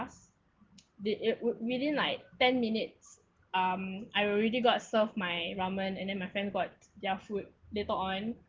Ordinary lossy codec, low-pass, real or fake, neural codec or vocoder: Opus, 16 kbps; 7.2 kHz; real; none